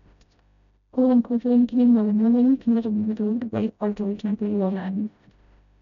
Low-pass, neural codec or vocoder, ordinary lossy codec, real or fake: 7.2 kHz; codec, 16 kHz, 0.5 kbps, FreqCodec, smaller model; none; fake